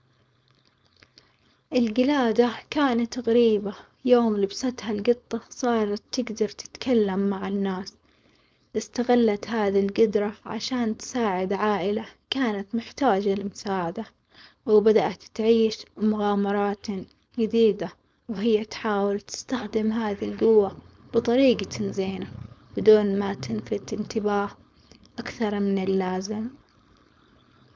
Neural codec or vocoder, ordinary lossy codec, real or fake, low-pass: codec, 16 kHz, 4.8 kbps, FACodec; none; fake; none